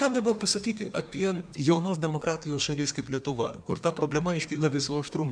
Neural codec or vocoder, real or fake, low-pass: codec, 24 kHz, 1 kbps, SNAC; fake; 9.9 kHz